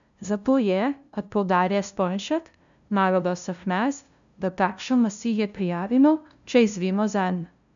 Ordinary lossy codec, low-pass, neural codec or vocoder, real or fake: none; 7.2 kHz; codec, 16 kHz, 0.5 kbps, FunCodec, trained on LibriTTS, 25 frames a second; fake